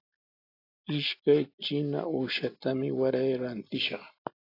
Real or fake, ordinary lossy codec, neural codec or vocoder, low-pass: real; AAC, 32 kbps; none; 5.4 kHz